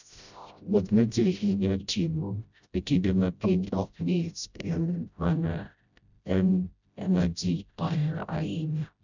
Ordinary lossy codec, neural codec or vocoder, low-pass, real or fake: none; codec, 16 kHz, 0.5 kbps, FreqCodec, smaller model; 7.2 kHz; fake